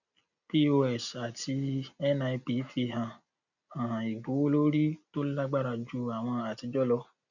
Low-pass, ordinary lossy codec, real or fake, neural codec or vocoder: 7.2 kHz; none; real; none